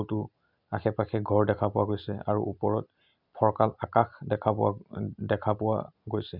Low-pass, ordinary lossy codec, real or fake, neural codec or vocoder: 5.4 kHz; none; real; none